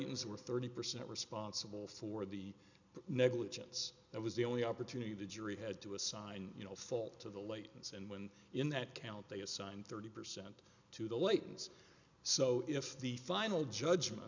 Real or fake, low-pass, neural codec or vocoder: real; 7.2 kHz; none